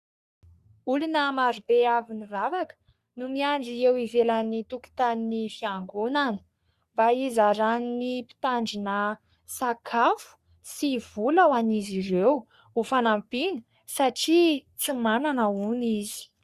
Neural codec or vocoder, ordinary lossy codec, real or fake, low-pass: codec, 44.1 kHz, 3.4 kbps, Pupu-Codec; Opus, 64 kbps; fake; 14.4 kHz